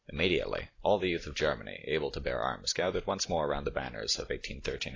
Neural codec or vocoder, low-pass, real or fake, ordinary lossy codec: none; 7.2 kHz; real; AAC, 32 kbps